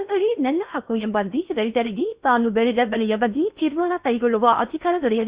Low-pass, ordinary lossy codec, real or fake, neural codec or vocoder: 3.6 kHz; Opus, 64 kbps; fake; codec, 16 kHz in and 24 kHz out, 0.6 kbps, FocalCodec, streaming, 4096 codes